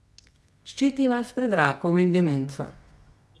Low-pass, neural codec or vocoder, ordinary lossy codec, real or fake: none; codec, 24 kHz, 0.9 kbps, WavTokenizer, medium music audio release; none; fake